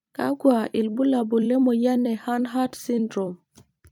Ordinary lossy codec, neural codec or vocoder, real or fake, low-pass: none; vocoder, 44.1 kHz, 128 mel bands every 256 samples, BigVGAN v2; fake; 19.8 kHz